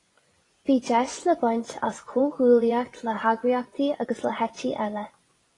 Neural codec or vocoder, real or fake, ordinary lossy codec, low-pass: vocoder, 24 kHz, 100 mel bands, Vocos; fake; AAC, 32 kbps; 10.8 kHz